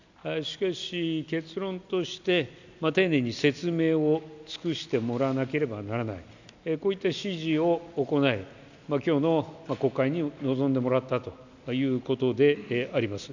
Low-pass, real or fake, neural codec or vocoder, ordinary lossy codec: 7.2 kHz; real; none; none